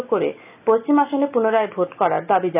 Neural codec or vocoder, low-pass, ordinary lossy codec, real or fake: none; 3.6 kHz; none; real